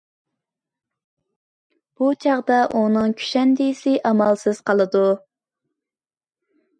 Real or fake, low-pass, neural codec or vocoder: real; 9.9 kHz; none